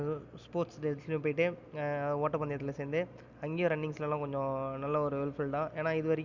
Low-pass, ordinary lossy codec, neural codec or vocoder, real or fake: 7.2 kHz; Opus, 64 kbps; none; real